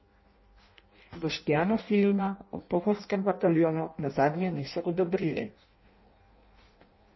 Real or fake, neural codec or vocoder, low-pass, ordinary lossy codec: fake; codec, 16 kHz in and 24 kHz out, 0.6 kbps, FireRedTTS-2 codec; 7.2 kHz; MP3, 24 kbps